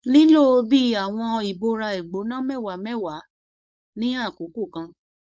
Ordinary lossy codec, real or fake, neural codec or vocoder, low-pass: none; fake; codec, 16 kHz, 4.8 kbps, FACodec; none